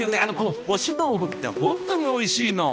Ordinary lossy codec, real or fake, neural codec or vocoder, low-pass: none; fake; codec, 16 kHz, 1 kbps, X-Codec, HuBERT features, trained on balanced general audio; none